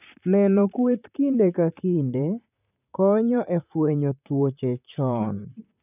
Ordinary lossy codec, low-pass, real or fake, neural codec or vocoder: none; 3.6 kHz; fake; vocoder, 44.1 kHz, 128 mel bands, Pupu-Vocoder